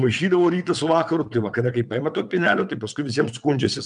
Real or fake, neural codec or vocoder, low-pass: fake; vocoder, 22.05 kHz, 80 mel bands, WaveNeXt; 9.9 kHz